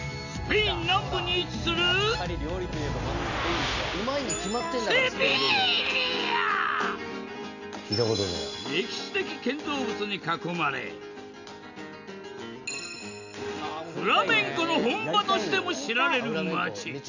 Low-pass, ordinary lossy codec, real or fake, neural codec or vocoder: 7.2 kHz; none; real; none